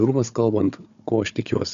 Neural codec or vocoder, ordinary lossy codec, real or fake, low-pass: codec, 16 kHz, 4 kbps, FunCodec, trained on Chinese and English, 50 frames a second; AAC, 96 kbps; fake; 7.2 kHz